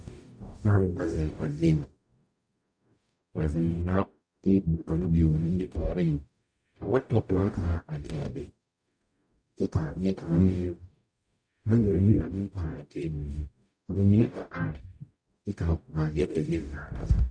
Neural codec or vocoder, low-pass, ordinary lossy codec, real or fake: codec, 44.1 kHz, 0.9 kbps, DAC; 9.9 kHz; MP3, 96 kbps; fake